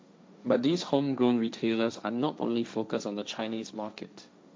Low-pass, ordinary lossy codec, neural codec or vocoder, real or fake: none; none; codec, 16 kHz, 1.1 kbps, Voila-Tokenizer; fake